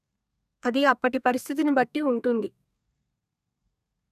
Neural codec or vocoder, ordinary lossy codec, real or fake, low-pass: codec, 32 kHz, 1.9 kbps, SNAC; none; fake; 14.4 kHz